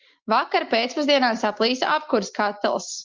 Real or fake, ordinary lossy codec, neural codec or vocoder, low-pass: real; Opus, 32 kbps; none; 7.2 kHz